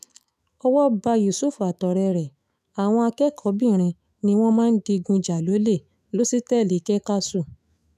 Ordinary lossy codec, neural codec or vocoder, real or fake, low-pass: none; autoencoder, 48 kHz, 128 numbers a frame, DAC-VAE, trained on Japanese speech; fake; 14.4 kHz